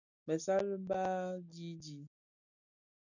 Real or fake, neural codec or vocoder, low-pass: real; none; 7.2 kHz